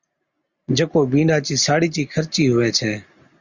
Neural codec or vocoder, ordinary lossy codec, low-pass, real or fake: none; Opus, 64 kbps; 7.2 kHz; real